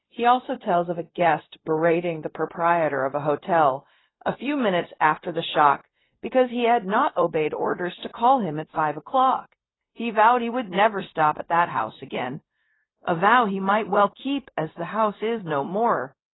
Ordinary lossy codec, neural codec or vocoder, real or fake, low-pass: AAC, 16 kbps; codec, 16 kHz, 0.4 kbps, LongCat-Audio-Codec; fake; 7.2 kHz